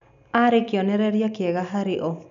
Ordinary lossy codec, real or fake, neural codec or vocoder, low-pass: none; real; none; 7.2 kHz